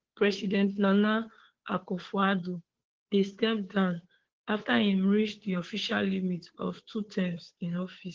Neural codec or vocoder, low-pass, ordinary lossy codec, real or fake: codec, 16 kHz, 2 kbps, FunCodec, trained on Chinese and English, 25 frames a second; 7.2 kHz; Opus, 16 kbps; fake